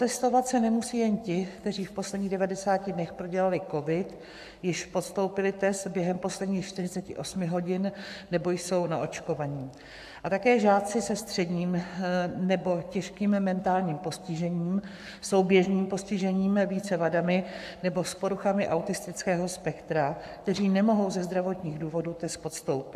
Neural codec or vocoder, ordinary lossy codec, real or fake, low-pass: codec, 44.1 kHz, 7.8 kbps, Pupu-Codec; AAC, 96 kbps; fake; 14.4 kHz